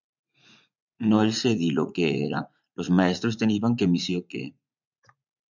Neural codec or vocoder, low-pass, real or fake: vocoder, 44.1 kHz, 80 mel bands, Vocos; 7.2 kHz; fake